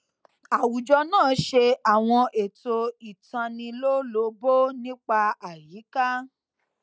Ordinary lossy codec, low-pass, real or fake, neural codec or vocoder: none; none; real; none